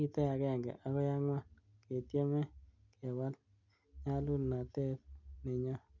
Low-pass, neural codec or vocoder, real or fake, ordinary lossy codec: 7.2 kHz; none; real; none